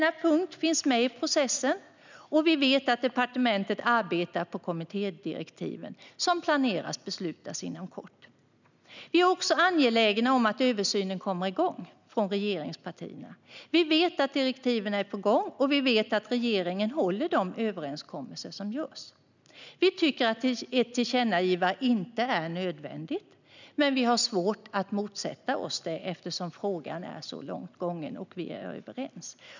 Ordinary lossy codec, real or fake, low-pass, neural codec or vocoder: none; real; 7.2 kHz; none